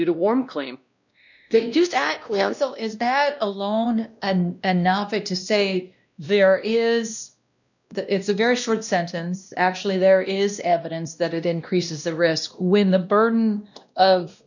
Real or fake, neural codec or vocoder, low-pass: fake; codec, 16 kHz, 1 kbps, X-Codec, WavLM features, trained on Multilingual LibriSpeech; 7.2 kHz